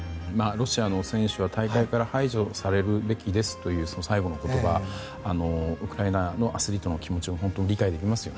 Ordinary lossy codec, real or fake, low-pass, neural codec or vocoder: none; real; none; none